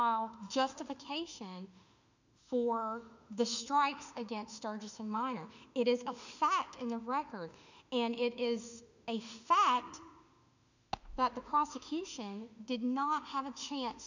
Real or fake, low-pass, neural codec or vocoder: fake; 7.2 kHz; autoencoder, 48 kHz, 32 numbers a frame, DAC-VAE, trained on Japanese speech